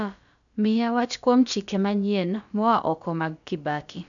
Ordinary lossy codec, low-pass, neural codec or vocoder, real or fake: none; 7.2 kHz; codec, 16 kHz, about 1 kbps, DyCAST, with the encoder's durations; fake